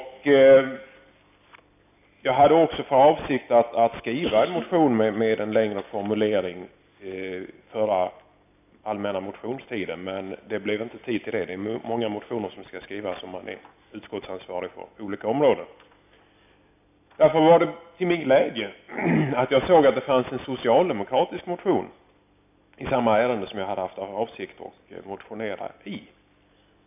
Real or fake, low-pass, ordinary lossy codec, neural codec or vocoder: real; 3.6 kHz; none; none